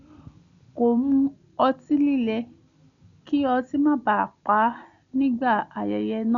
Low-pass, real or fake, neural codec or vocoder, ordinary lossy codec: 7.2 kHz; real; none; none